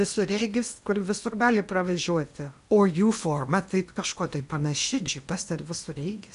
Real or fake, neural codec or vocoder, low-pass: fake; codec, 16 kHz in and 24 kHz out, 0.8 kbps, FocalCodec, streaming, 65536 codes; 10.8 kHz